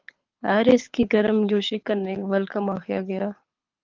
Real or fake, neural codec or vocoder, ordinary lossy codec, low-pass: fake; codec, 24 kHz, 6 kbps, HILCodec; Opus, 32 kbps; 7.2 kHz